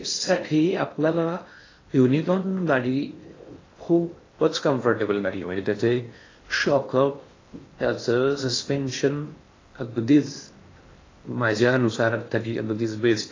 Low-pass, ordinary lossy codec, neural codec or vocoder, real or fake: 7.2 kHz; AAC, 32 kbps; codec, 16 kHz in and 24 kHz out, 0.6 kbps, FocalCodec, streaming, 4096 codes; fake